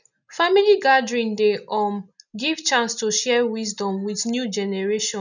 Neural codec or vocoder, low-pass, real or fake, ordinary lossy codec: none; 7.2 kHz; real; none